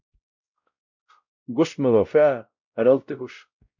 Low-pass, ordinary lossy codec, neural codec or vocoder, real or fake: 7.2 kHz; MP3, 64 kbps; codec, 16 kHz, 0.5 kbps, X-Codec, WavLM features, trained on Multilingual LibriSpeech; fake